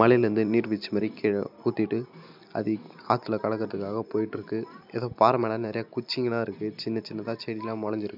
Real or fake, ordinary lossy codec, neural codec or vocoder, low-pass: real; none; none; 5.4 kHz